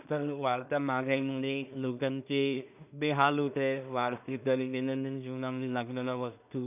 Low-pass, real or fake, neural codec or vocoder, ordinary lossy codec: 3.6 kHz; fake; codec, 16 kHz in and 24 kHz out, 0.4 kbps, LongCat-Audio-Codec, two codebook decoder; none